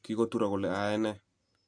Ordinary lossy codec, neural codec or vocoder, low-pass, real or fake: AAC, 64 kbps; none; 9.9 kHz; real